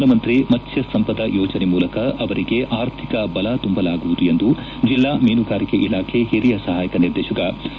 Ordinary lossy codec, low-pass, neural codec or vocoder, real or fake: none; none; none; real